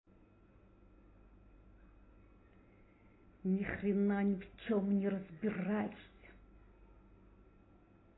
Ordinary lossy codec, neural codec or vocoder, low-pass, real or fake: AAC, 16 kbps; none; 7.2 kHz; real